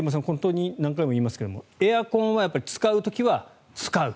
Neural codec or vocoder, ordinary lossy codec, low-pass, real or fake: none; none; none; real